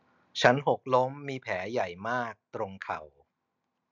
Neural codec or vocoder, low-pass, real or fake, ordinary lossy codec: none; 7.2 kHz; real; none